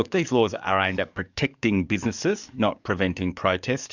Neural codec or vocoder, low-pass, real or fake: codec, 44.1 kHz, 7.8 kbps, DAC; 7.2 kHz; fake